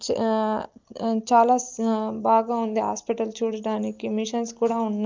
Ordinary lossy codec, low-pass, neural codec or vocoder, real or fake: Opus, 24 kbps; 7.2 kHz; none; real